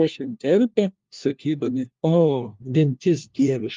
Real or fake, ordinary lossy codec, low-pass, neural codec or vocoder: fake; Opus, 32 kbps; 7.2 kHz; codec, 16 kHz, 1 kbps, FunCodec, trained on LibriTTS, 50 frames a second